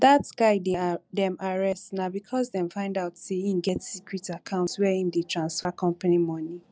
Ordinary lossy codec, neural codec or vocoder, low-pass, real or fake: none; none; none; real